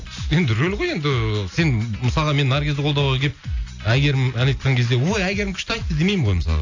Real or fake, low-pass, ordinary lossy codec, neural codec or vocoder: real; 7.2 kHz; AAC, 48 kbps; none